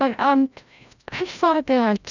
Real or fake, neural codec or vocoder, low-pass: fake; codec, 16 kHz, 0.5 kbps, FreqCodec, larger model; 7.2 kHz